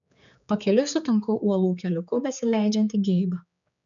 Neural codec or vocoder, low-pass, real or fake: codec, 16 kHz, 4 kbps, X-Codec, HuBERT features, trained on general audio; 7.2 kHz; fake